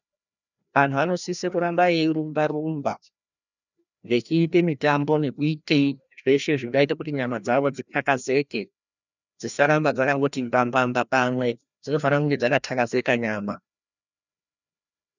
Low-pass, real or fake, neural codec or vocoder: 7.2 kHz; fake; codec, 16 kHz, 1 kbps, FreqCodec, larger model